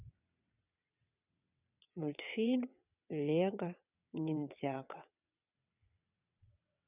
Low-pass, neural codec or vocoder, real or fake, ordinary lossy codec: 3.6 kHz; vocoder, 22.05 kHz, 80 mel bands, WaveNeXt; fake; none